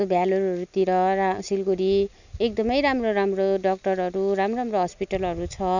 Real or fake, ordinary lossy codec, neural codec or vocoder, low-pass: real; none; none; 7.2 kHz